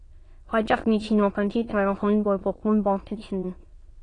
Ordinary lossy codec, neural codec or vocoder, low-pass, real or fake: AAC, 32 kbps; autoencoder, 22.05 kHz, a latent of 192 numbers a frame, VITS, trained on many speakers; 9.9 kHz; fake